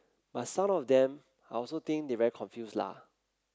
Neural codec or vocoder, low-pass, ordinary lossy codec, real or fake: none; none; none; real